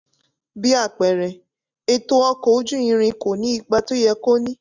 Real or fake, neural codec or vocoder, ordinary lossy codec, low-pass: real; none; none; 7.2 kHz